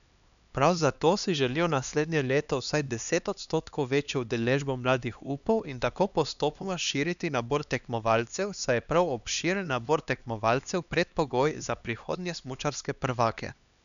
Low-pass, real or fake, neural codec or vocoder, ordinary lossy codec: 7.2 kHz; fake; codec, 16 kHz, 2 kbps, X-Codec, HuBERT features, trained on LibriSpeech; none